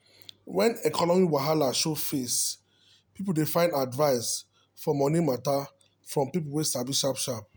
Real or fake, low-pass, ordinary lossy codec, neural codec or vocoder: real; none; none; none